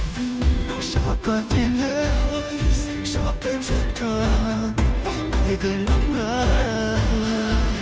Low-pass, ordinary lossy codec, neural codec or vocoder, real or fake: none; none; codec, 16 kHz, 0.5 kbps, FunCodec, trained on Chinese and English, 25 frames a second; fake